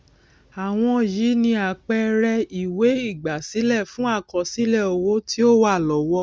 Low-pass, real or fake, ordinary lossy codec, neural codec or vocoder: none; real; none; none